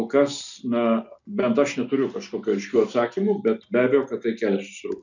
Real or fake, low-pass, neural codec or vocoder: real; 7.2 kHz; none